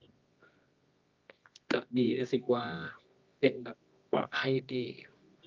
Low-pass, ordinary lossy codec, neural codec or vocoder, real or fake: 7.2 kHz; Opus, 24 kbps; codec, 24 kHz, 0.9 kbps, WavTokenizer, medium music audio release; fake